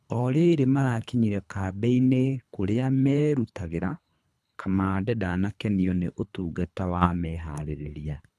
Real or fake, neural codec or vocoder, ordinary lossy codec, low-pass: fake; codec, 24 kHz, 3 kbps, HILCodec; none; none